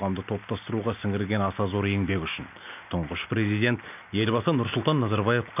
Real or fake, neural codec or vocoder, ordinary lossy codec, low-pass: real; none; none; 3.6 kHz